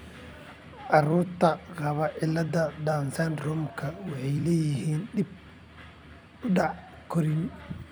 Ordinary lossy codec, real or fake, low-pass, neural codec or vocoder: none; fake; none; vocoder, 44.1 kHz, 128 mel bands every 256 samples, BigVGAN v2